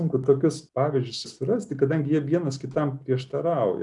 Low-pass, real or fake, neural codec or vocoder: 10.8 kHz; real; none